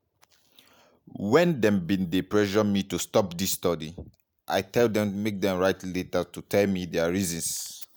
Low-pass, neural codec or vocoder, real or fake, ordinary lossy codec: none; none; real; none